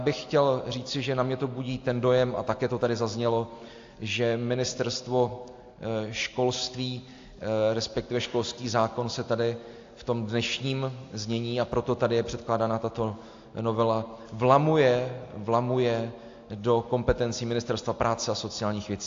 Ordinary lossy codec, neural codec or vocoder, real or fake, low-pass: AAC, 48 kbps; none; real; 7.2 kHz